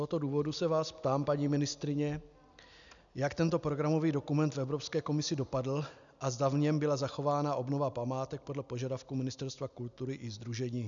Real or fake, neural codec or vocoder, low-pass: real; none; 7.2 kHz